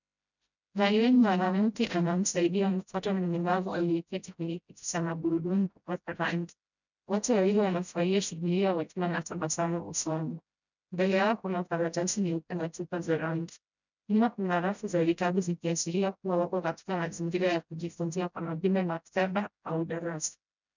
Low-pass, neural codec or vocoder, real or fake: 7.2 kHz; codec, 16 kHz, 0.5 kbps, FreqCodec, smaller model; fake